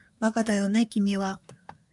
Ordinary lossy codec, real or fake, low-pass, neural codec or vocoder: MP3, 96 kbps; fake; 10.8 kHz; codec, 24 kHz, 1 kbps, SNAC